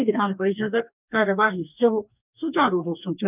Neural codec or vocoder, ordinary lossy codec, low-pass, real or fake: codec, 44.1 kHz, 2.6 kbps, DAC; none; 3.6 kHz; fake